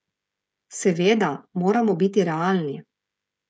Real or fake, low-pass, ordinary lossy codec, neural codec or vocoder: fake; none; none; codec, 16 kHz, 16 kbps, FreqCodec, smaller model